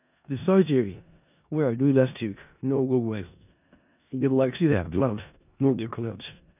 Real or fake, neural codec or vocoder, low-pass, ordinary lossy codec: fake; codec, 16 kHz in and 24 kHz out, 0.4 kbps, LongCat-Audio-Codec, four codebook decoder; 3.6 kHz; none